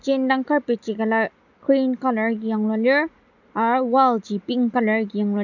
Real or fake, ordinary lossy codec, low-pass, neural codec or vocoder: real; none; 7.2 kHz; none